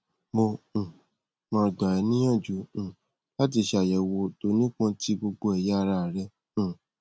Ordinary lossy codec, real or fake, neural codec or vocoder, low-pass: none; real; none; none